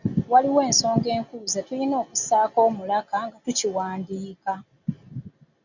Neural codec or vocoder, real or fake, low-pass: none; real; 7.2 kHz